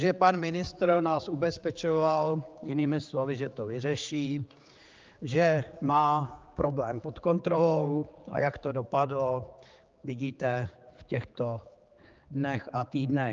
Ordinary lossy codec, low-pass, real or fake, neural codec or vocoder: Opus, 24 kbps; 7.2 kHz; fake; codec, 16 kHz, 4 kbps, X-Codec, HuBERT features, trained on general audio